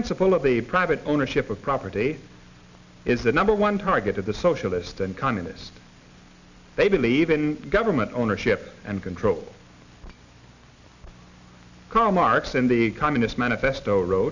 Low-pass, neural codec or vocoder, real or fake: 7.2 kHz; none; real